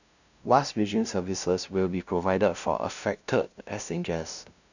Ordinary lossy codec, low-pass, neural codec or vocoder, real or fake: none; 7.2 kHz; codec, 16 kHz, 0.5 kbps, FunCodec, trained on LibriTTS, 25 frames a second; fake